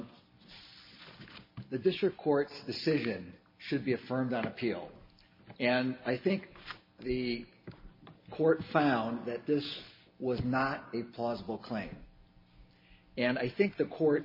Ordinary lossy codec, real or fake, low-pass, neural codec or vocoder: MP3, 24 kbps; real; 5.4 kHz; none